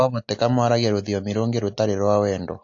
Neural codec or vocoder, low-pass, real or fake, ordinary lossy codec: none; 7.2 kHz; real; AAC, 48 kbps